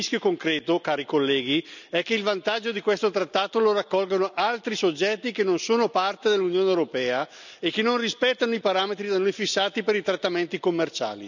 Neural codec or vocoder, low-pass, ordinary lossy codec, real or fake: none; 7.2 kHz; none; real